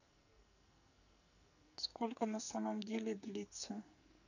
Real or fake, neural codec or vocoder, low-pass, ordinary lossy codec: fake; codec, 44.1 kHz, 2.6 kbps, SNAC; 7.2 kHz; none